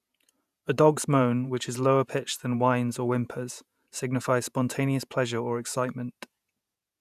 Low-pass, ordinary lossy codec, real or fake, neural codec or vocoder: 14.4 kHz; none; fake; vocoder, 48 kHz, 128 mel bands, Vocos